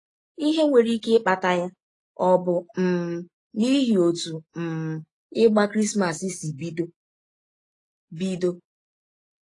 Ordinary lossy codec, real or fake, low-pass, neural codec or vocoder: AAC, 32 kbps; real; 10.8 kHz; none